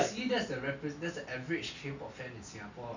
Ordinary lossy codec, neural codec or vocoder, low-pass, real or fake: none; none; 7.2 kHz; real